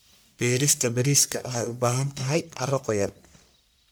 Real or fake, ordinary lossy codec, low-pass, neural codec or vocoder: fake; none; none; codec, 44.1 kHz, 1.7 kbps, Pupu-Codec